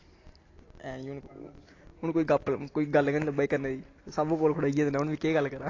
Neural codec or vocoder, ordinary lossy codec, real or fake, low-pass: none; AAC, 32 kbps; real; 7.2 kHz